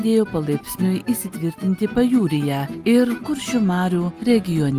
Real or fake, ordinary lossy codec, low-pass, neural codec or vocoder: real; Opus, 32 kbps; 14.4 kHz; none